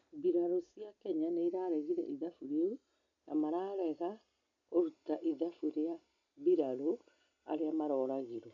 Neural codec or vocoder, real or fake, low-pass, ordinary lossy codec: none; real; 7.2 kHz; none